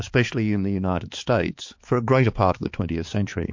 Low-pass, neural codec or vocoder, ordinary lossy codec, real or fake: 7.2 kHz; codec, 16 kHz, 4 kbps, X-Codec, HuBERT features, trained on balanced general audio; MP3, 48 kbps; fake